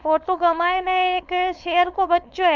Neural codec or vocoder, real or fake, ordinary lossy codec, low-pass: codec, 16 kHz, 4.8 kbps, FACodec; fake; none; 7.2 kHz